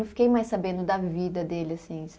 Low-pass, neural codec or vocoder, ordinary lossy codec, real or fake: none; none; none; real